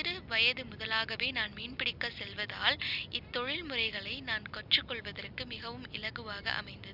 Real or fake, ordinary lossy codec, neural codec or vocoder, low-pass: real; none; none; 5.4 kHz